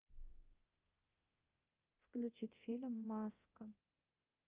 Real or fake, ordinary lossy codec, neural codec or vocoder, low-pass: fake; Opus, 24 kbps; codec, 24 kHz, 0.9 kbps, DualCodec; 3.6 kHz